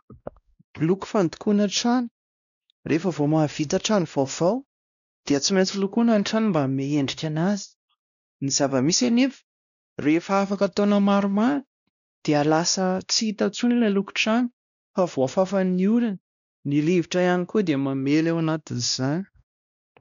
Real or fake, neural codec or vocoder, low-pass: fake; codec, 16 kHz, 1 kbps, X-Codec, WavLM features, trained on Multilingual LibriSpeech; 7.2 kHz